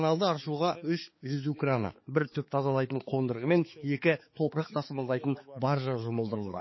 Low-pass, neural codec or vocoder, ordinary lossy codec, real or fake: 7.2 kHz; codec, 16 kHz, 4 kbps, X-Codec, HuBERT features, trained on balanced general audio; MP3, 24 kbps; fake